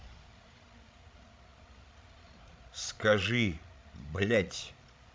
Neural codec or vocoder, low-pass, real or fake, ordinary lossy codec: codec, 16 kHz, 16 kbps, FreqCodec, larger model; none; fake; none